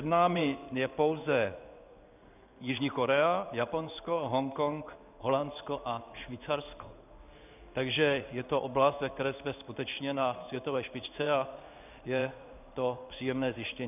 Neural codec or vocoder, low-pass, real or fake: codec, 16 kHz in and 24 kHz out, 1 kbps, XY-Tokenizer; 3.6 kHz; fake